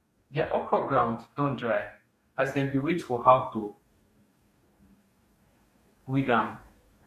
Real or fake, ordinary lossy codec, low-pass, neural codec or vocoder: fake; MP3, 64 kbps; 14.4 kHz; codec, 44.1 kHz, 2.6 kbps, DAC